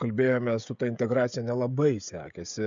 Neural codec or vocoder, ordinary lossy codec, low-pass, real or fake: codec, 16 kHz, 16 kbps, FreqCodec, smaller model; MP3, 64 kbps; 7.2 kHz; fake